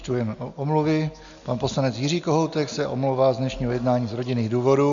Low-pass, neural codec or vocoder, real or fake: 7.2 kHz; none; real